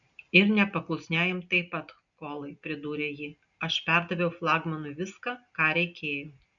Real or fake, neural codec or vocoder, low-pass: real; none; 7.2 kHz